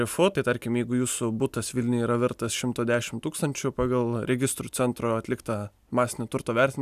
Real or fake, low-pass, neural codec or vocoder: real; 14.4 kHz; none